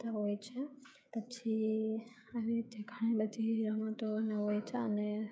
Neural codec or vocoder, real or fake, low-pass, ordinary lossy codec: codec, 16 kHz, 16 kbps, FreqCodec, smaller model; fake; none; none